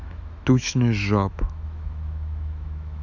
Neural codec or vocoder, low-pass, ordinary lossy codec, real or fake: autoencoder, 48 kHz, 128 numbers a frame, DAC-VAE, trained on Japanese speech; 7.2 kHz; none; fake